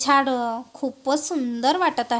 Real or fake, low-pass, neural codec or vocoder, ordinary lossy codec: real; none; none; none